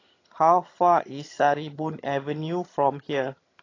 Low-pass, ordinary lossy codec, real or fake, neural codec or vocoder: 7.2 kHz; AAC, 32 kbps; fake; vocoder, 22.05 kHz, 80 mel bands, HiFi-GAN